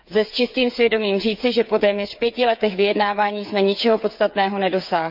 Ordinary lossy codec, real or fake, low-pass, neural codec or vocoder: none; fake; 5.4 kHz; codec, 16 kHz, 8 kbps, FreqCodec, smaller model